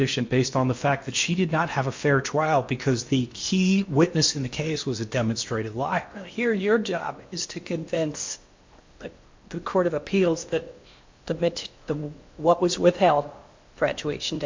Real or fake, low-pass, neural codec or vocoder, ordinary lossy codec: fake; 7.2 kHz; codec, 16 kHz in and 24 kHz out, 0.8 kbps, FocalCodec, streaming, 65536 codes; MP3, 48 kbps